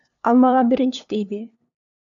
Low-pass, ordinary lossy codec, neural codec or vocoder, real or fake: 7.2 kHz; AAC, 64 kbps; codec, 16 kHz, 2 kbps, FunCodec, trained on LibriTTS, 25 frames a second; fake